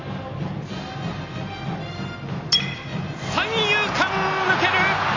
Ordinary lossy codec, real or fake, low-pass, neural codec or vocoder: AAC, 32 kbps; real; 7.2 kHz; none